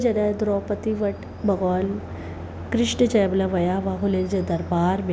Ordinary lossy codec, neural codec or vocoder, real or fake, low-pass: none; none; real; none